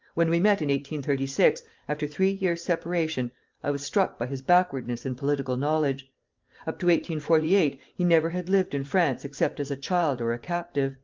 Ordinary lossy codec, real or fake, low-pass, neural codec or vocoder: Opus, 32 kbps; fake; 7.2 kHz; vocoder, 44.1 kHz, 128 mel bands every 512 samples, BigVGAN v2